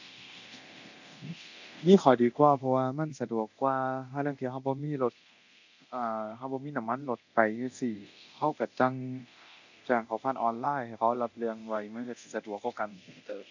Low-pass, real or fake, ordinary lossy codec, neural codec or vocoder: 7.2 kHz; fake; none; codec, 24 kHz, 0.9 kbps, DualCodec